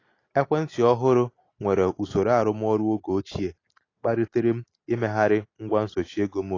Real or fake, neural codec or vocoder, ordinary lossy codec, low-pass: real; none; AAC, 32 kbps; 7.2 kHz